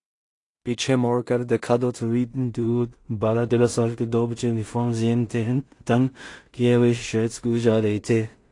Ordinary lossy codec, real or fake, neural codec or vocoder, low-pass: AAC, 48 kbps; fake; codec, 16 kHz in and 24 kHz out, 0.4 kbps, LongCat-Audio-Codec, two codebook decoder; 10.8 kHz